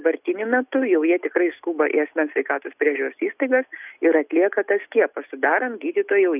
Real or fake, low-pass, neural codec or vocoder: real; 3.6 kHz; none